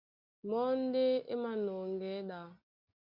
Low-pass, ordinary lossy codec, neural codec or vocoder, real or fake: 5.4 kHz; Opus, 64 kbps; none; real